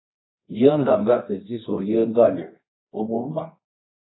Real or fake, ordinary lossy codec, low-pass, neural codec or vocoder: fake; AAC, 16 kbps; 7.2 kHz; codec, 24 kHz, 0.9 kbps, WavTokenizer, medium music audio release